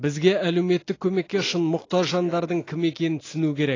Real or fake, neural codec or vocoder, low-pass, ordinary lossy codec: real; none; 7.2 kHz; AAC, 32 kbps